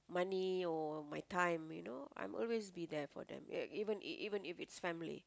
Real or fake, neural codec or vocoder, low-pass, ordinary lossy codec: real; none; none; none